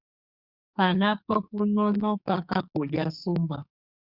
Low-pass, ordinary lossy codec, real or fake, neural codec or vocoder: 5.4 kHz; Opus, 64 kbps; fake; codec, 44.1 kHz, 2.6 kbps, SNAC